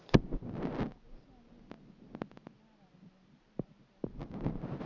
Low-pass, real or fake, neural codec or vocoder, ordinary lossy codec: 7.2 kHz; real; none; none